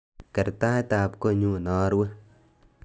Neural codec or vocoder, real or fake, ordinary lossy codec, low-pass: none; real; none; none